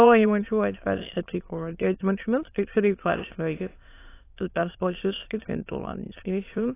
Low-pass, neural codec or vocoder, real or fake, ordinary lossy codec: 3.6 kHz; autoencoder, 22.05 kHz, a latent of 192 numbers a frame, VITS, trained on many speakers; fake; AAC, 24 kbps